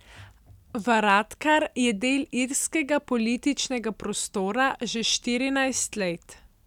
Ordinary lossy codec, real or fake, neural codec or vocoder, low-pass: none; real; none; 19.8 kHz